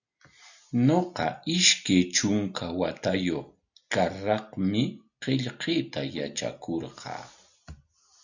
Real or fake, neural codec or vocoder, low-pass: real; none; 7.2 kHz